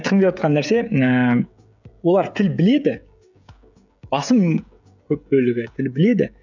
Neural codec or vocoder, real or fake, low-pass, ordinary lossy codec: codec, 16 kHz, 16 kbps, FreqCodec, smaller model; fake; 7.2 kHz; none